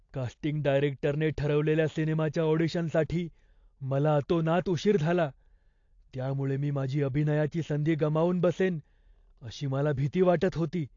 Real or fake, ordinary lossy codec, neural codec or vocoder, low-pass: real; AAC, 48 kbps; none; 7.2 kHz